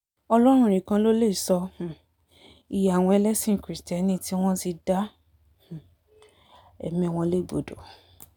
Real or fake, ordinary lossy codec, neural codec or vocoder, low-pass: real; none; none; 19.8 kHz